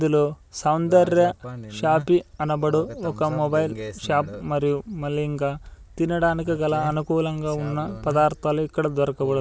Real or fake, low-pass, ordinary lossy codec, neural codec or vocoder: real; none; none; none